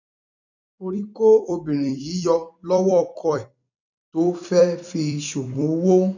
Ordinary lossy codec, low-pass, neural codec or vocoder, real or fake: none; 7.2 kHz; vocoder, 44.1 kHz, 128 mel bands every 256 samples, BigVGAN v2; fake